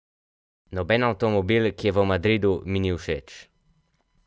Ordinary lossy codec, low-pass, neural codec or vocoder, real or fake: none; none; none; real